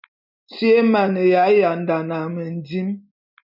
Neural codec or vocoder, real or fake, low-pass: none; real; 5.4 kHz